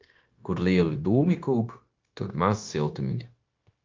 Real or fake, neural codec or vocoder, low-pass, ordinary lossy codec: fake; codec, 16 kHz, 0.9 kbps, LongCat-Audio-Codec; 7.2 kHz; Opus, 24 kbps